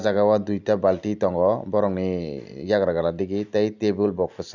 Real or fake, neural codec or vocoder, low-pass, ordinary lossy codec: real; none; 7.2 kHz; none